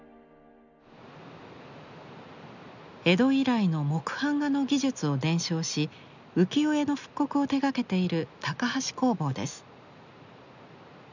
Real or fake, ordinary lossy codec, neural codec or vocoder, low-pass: real; none; none; 7.2 kHz